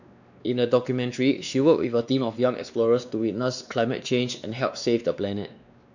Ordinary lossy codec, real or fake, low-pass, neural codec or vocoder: none; fake; 7.2 kHz; codec, 16 kHz, 2 kbps, X-Codec, WavLM features, trained on Multilingual LibriSpeech